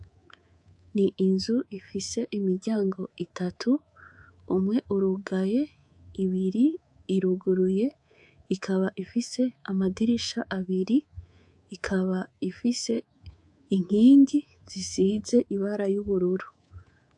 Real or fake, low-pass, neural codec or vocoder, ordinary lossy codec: fake; 10.8 kHz; codec, 24 kHz, 3.1 kbps, DualCodec; AAC, 64 kbps